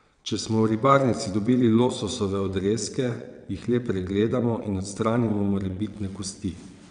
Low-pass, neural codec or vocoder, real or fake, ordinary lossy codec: 9.9 kHz; vocoder, 22.05 kHz, 80 mel bands, WaveNeXt; fake; none